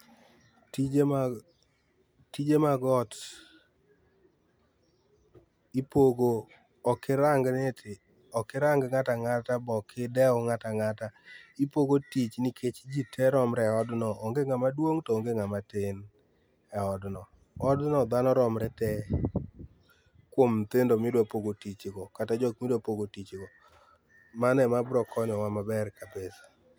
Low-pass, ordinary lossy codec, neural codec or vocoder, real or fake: none; none; none; real